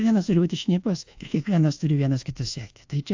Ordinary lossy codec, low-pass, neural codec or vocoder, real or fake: AAC, 48 kbps; 7.2 kHz; codec, 24 kHz, 1.2 kbps, DualCodec; fake